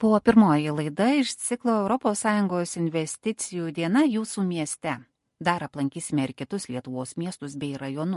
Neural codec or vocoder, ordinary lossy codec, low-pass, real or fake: none; MP3, 48 kbps; 14.4 kHz; real